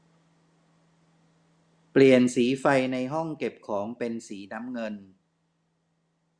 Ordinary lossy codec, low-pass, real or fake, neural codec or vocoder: Opus, 64 kbps; 9.9 kHz; real; none